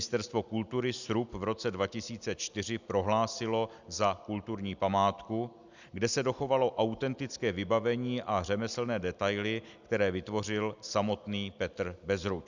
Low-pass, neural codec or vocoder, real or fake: 7.2 kHz; none; real